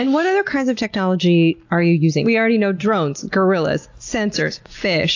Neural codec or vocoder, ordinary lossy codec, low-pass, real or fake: none; AAC, 48 kbps; 7.2 kHz; real